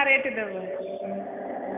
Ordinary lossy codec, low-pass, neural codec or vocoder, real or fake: none; 3.6 kHz; none; real